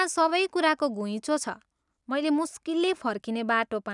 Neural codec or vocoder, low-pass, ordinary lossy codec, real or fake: none; 10.8 kHz; none; real